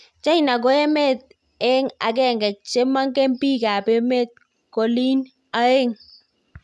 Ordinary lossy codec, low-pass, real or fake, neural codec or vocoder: none; none; real; none